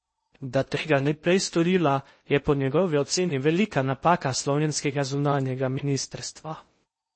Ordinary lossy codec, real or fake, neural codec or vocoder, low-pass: MP3, 32 kbps; fake; codec, 16 kHz in and 24 kHz out, 0.6 kbps, FocalCodec, streaming, 2048 codes; 9.9 kHz